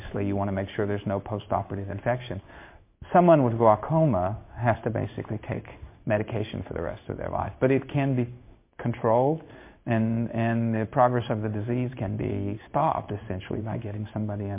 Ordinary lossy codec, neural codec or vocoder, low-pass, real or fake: MP3, 24 kbps; codec, 16 kHz in and 24 kHz out, 1 kbps, XY-Tokenizer; 3.6 kHz; fake